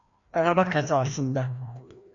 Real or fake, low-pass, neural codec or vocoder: fake; 7.2 kHz; codec, 16 kHz, 1 kbps, FreqCodec, larger model